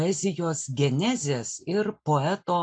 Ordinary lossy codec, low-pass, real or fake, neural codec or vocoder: AAC, 64 kbps; 9.9 kHz; real; none